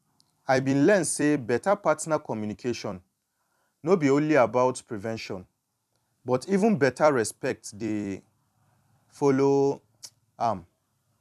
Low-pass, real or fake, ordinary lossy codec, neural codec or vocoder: 14.4 kHz; fake; none; vocoder, 44.1 kHz, 128 mel bands every 256 samples, BigVGAN v2